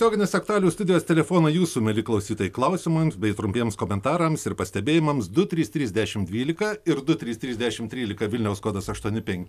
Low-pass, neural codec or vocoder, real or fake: 14.4 kHz; none; real